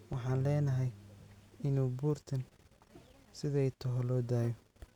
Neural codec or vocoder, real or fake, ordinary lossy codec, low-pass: none; real; none; 19.8 kHz